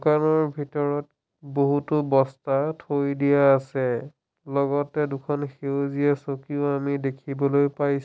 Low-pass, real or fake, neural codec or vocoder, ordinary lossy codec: none; real; none; none